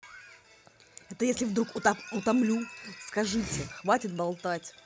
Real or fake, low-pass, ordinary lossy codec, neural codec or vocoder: real; none; none; none